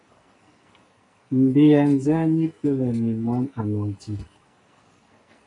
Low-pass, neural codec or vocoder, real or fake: 10.8 kHz; codec, 44.1 kHz, 2.6 kbps, SNAC; fake